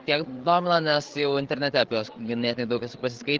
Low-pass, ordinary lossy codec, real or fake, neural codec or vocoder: 7.2 kHz; Opus, 16 kbps; fake; codec, 16 kHz, 8 kbps, FreqCodec, larger model